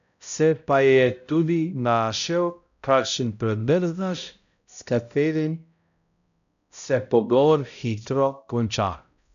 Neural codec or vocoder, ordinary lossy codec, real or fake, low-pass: codec, 16 kHz, 0.5 kbps, X-Codec, HuBERT features, trained on balanced general audio; none; fake; 7.2 kHz